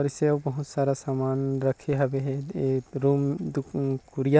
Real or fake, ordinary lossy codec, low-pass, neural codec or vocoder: real; none; none; none